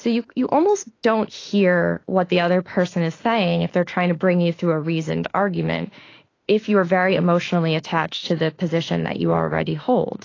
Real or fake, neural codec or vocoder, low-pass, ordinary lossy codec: fake; autoencoder, 48 kHz, 32 numbers a frame, DAC-VAE, trained on Japanese speech; 7.2 kHz; AAC, 32 kbps